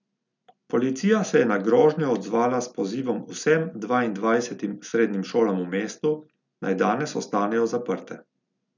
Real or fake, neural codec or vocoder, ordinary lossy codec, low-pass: real; none; none; 7.2 kHz